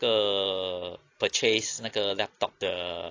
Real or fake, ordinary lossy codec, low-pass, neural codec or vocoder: real; AAC, 32 kbps; 7.2 kHz; none